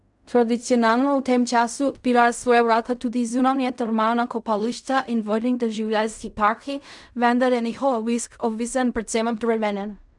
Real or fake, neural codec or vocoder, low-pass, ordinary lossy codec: fake; codec, 16 kHz in and 24 kHz out, 0.4 kbps, LongCat-Audio-Codec, fine tuned four codebook decoder; 10.8 kHz; none